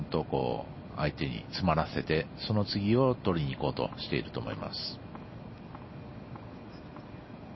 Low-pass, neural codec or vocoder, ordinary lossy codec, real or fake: 7.2 kHz; none; MP3, 24 kbps; real